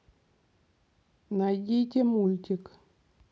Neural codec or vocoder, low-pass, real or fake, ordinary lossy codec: none; none; real; none